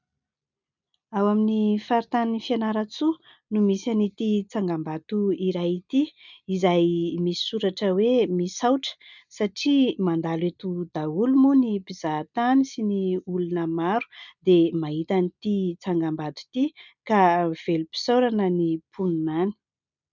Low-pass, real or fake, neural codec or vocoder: 7.2 kHz; real; none